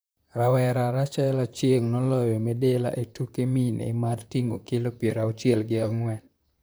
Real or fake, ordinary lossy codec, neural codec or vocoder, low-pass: fake; none; vocoder, 44.1 kHz, 128 mel bands, Pupu-Vocoder; none